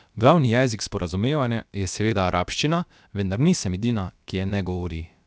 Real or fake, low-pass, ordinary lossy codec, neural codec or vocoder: fake; none; none; codec, 16 kHz, about 1 kbps, DyCAST, with the encoder's durations